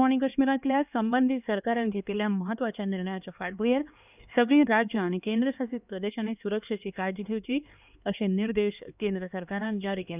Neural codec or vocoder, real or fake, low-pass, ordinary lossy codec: codec, 16 kHz, 2 kbps, X-Codec, HuBERT features, trained on LibriSpeech; fake; 3.6 kHz; none